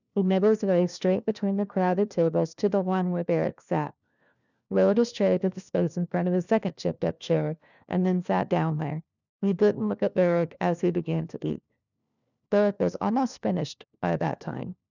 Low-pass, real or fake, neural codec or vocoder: 7.2 kHz; fake; codec, 16 kHz, 1 kbps, FunCodec, trained on LibriTTS, 50 frames a second